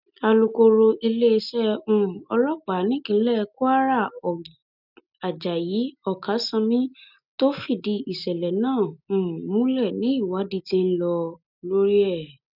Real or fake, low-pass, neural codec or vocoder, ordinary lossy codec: real; 5.4 kHz; none; none